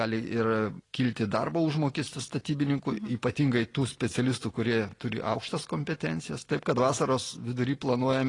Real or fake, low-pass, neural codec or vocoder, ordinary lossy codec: real; 10.8 kHz; none; AAC, 32 kbps